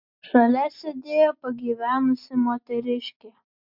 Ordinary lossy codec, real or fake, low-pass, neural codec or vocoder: MP3, 48 kbps; real; 5.4 kHz; none